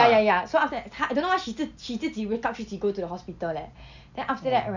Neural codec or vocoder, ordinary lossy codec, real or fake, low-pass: none; none; real; 7.2 kHz